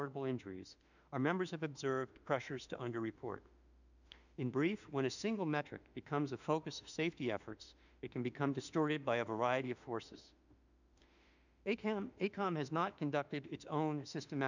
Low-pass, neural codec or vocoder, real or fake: 7.2 kHz; autoencoder, 48 kHz, 32 numbers a frame, DAC-VAE, trained on Japanese speech; fake